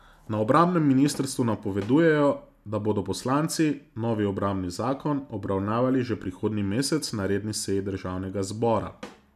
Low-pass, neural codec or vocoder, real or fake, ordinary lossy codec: 14.4 kHz; none; real; none